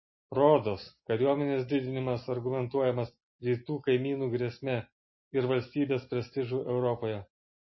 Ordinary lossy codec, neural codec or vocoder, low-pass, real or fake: MP3, 24 kbps; none; 7.2 kHz; real